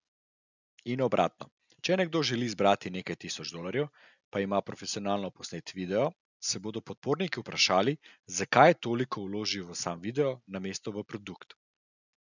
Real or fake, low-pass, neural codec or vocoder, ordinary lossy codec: real; 7.2 kHz; none; none